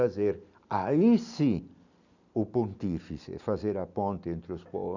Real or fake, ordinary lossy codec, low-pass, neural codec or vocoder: real; none; 7.2 kHz; none